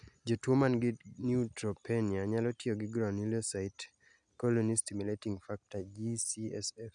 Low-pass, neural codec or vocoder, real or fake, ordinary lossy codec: 9.9 kHz; none; real; none